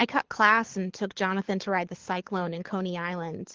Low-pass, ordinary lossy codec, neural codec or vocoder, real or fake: 7.2 kHz; Opus, 16 kbps; codec, 24 kHz, 6 kbps, HILCodec; fake